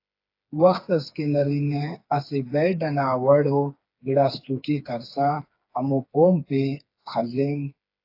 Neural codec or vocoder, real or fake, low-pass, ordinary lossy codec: codec, 16 kHz, 4 kbps, FreqCodec, smaller model; fake; 5.4 kHz; AAC, 32 kbps